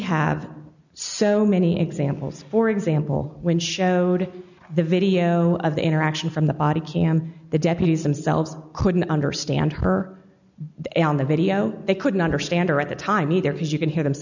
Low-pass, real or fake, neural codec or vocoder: 7.2 kHz; real; none